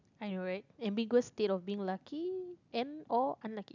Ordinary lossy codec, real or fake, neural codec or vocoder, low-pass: none; real; none; 7.2 kHz